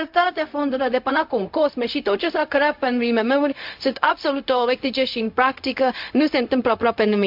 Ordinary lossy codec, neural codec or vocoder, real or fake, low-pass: MP3, 48 kbps; codec, 16 kHz, 0.4 kbps, LongCat-Audio-Codec; fake; 5.4 kHz